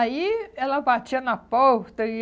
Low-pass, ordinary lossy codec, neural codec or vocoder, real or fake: none; none; none; real